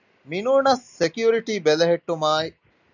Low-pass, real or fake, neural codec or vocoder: 7.2 kHz; real; none